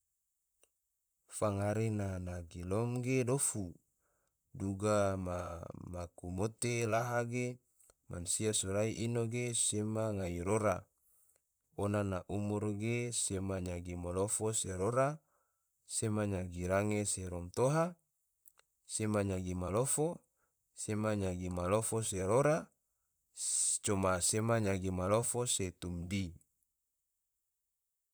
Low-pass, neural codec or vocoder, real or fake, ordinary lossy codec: none; vocoder, 44.1 kHz, 128 mel bands, Pupu-Vocoder; fake; none